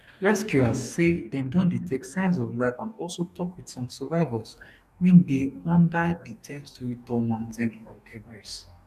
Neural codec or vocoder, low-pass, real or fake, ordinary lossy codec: codec, 44.1 kHz, 2.6 kbps, DAC; 14.4 kHz; fake; none